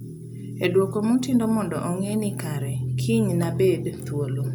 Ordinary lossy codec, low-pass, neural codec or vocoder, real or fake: none; none; none; real